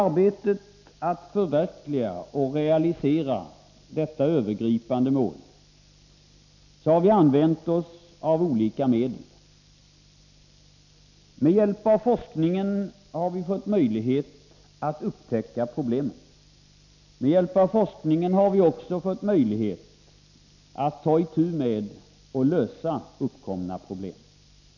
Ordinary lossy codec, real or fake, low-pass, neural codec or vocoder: none; real; 7.2 kHz; none